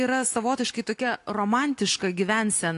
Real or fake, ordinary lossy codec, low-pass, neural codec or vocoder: real; AAC, 48 kbps; 10.8 kHz; none